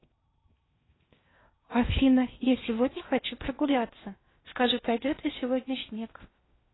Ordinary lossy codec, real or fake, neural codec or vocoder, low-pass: AAC, 16 kbps; fake; codec, 16 kHz in and 24 kHz out, 0.6 kbps, FocalCodec, streaming, 4096 codes; 7.2 kHz